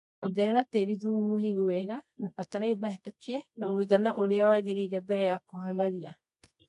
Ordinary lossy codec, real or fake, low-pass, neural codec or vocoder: AAC, 96 kbps; fake; 10.8 kHz; codec, 24 kHz, 0.9 kbps, WavTokenizer, medium music audio release